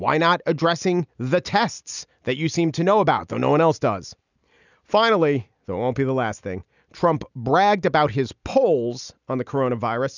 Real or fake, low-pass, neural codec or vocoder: real; 7.2 kHz; none